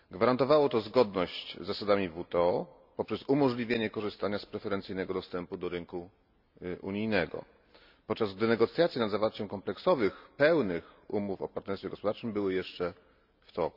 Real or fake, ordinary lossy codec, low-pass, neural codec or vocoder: real; none; 5.4 kHz; none